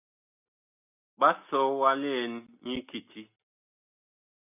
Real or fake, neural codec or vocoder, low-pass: real; none; 3.6 kHz